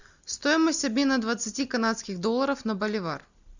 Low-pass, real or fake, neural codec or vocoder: 7.2 kHz; real; none